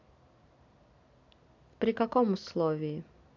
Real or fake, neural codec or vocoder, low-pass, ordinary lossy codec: real; none; 7.2 kHz; none